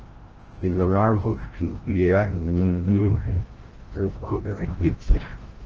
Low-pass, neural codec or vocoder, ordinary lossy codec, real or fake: 7.2 kHz; codec, 16 kHz, 0.5 kbps, FreqCodec, larger model; Opus, 16 kbps; fake